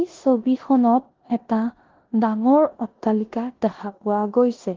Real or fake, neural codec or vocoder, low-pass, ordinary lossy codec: fake; codec, 16 kHz in and 24 kHz out, 0.9 kbps, LongCat-Audio-Codec, four codebook decoder; 7.2 kHz; Opus, 16 kbps